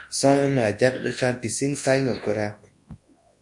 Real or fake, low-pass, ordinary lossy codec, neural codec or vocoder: fake; 10.8 kHz; MP3, 48 kbps; codec, 24 kHz, 0.9 kbps, WavTokenizer, large speech release